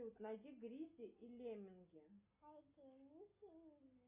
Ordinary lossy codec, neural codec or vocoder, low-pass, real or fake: Opus, 64 kbps; none; 3.6 kHz; real